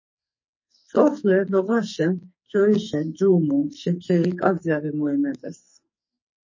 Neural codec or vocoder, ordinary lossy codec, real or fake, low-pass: codec, 44.1 kHz, 2.6 kbps, SNAC; MP3, 32 kbps; fake; 7.2 kHz